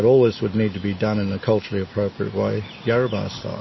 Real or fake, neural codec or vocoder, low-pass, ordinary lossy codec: real; none; 7.2 kHz; MP3, 24 kbps